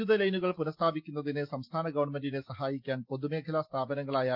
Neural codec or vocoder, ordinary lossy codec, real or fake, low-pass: none; Opus, 24 kbps; real; 5.4 kHz